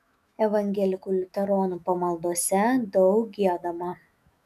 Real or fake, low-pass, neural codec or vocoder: fake; 14.4 kHz; autoencoder, 48 kHz, 128 numbers a frame, DAC-VAE, trained on Japanese speech